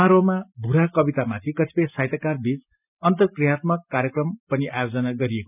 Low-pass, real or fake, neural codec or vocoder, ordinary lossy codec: 3.6 kHz; real; none; none